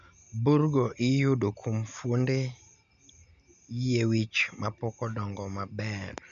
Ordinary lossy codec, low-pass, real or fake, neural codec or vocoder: none; 7.2 kHz; real; none